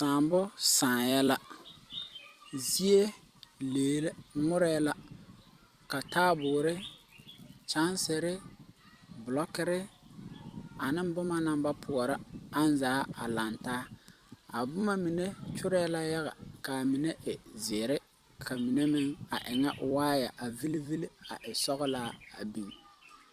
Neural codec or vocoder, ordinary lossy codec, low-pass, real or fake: vocoder, 44.1 kHz, 128 mel bands every 256 samples, BigVGAN v2; Opus, 64 kbps; 14.4 kHz; fake